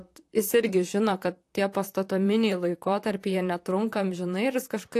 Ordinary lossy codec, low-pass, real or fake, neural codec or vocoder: AAC, 64 kbps; 14.4 kHz; fake; vocoder, 44.1 kHz, 128 mel bands, Pupu-Vocoder